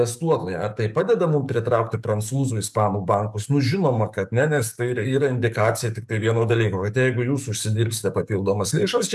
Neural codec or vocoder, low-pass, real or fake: codec, 44.1 kHz, 7.8 kbps, DAC; 14.4 kHz; fake